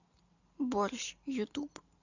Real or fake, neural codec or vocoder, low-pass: real; none; 7.2 kHz